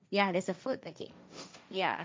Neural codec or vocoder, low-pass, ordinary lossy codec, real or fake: codec, 16 kHz, 1.1 kbps, Voila-Tokenizer; none; none; fake